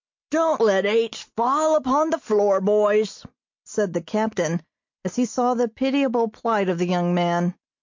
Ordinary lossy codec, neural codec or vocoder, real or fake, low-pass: MP3, 48 kbps; none; real; 7.2 kHz